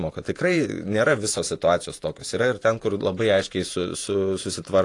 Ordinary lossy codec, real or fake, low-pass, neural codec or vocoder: AAC, 48 kbps; fake; 10.8 kHz; vocoder, 48 kHz, 128 mel bands, Vocos